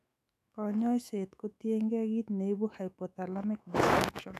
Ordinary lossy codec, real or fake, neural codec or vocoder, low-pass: none; fake; autoencoder, 48 kHz, 128 numbers a frame, DAC-VAE, trained on Japanese speech; 14.4 kHz